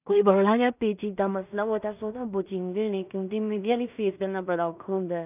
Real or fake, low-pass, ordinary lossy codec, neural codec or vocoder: fake; 3.6 kHz; none; codec, 16 kHz in and 24 kHz out, 0.4 kbps, LongCat-Audio-Codec, two codebook decoder